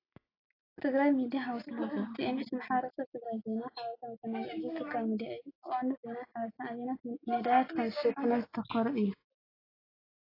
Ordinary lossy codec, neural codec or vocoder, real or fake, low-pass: AAC, 24 kbps; none; real; 5.4 kHz